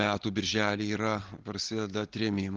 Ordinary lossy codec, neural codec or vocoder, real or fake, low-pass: Opus, 16 kbps; none; real; 7.2 kHz